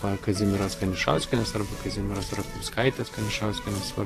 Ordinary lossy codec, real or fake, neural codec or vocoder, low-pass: AAC, 48 kbps; fake; vocoder, 48 kHz, 128 mel bands, Vocos; 14.4 kHz